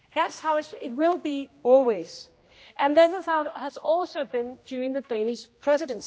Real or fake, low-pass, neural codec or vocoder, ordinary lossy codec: fake; none; codec, 16 kHz, 1 kbps, X-Codec, HuBERT features, trained on general audio; none